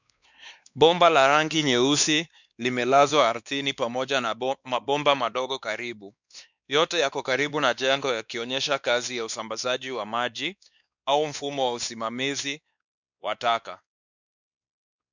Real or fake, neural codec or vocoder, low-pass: fake; codec, 16 kHz, 2 kbps, X-Codec, WavLM features, trained on Multilingual LibriSpeech; 7.2 kHz